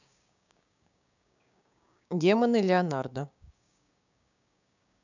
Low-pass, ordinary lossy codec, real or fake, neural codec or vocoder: 7.2 kHz; none; fake; codec, 16 kHz, 6 kbps, DAC